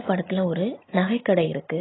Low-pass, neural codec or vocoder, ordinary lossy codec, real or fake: 7.2 kHz; none; AAC, 16 kbps; real